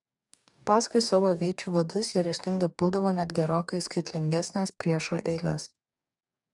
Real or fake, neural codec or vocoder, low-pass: fake; codec, 44.1 kHz, 2.6 kbps, DAC; 10.8 kHz